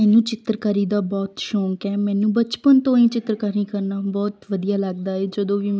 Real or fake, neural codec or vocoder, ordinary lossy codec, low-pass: real; none; none; none